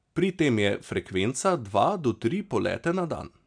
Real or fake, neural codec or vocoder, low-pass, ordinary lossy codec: real; none; 9.9 kHz; MP3, 96 kbps